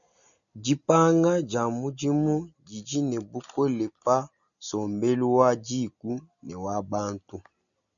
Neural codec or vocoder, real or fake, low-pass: none; real; 7.2 kHz